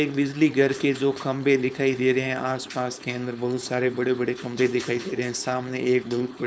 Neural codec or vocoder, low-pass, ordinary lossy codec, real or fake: codec, 16 kHz, 4.8 kbps, FACodec; none; none; fake